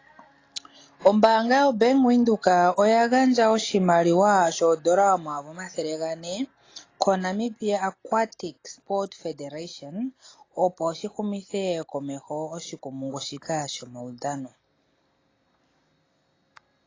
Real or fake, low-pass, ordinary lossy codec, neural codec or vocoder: real; 7.2 kHz; AAC, 32 kbps; none